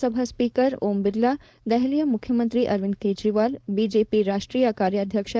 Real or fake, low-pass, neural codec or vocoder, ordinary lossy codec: fake; none; codec, 16 kHz, 4.8 kbps, FACodec; none